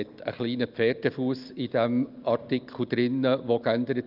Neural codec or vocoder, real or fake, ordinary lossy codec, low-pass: none; real; Opus, 24 kbps; 5.4 kHz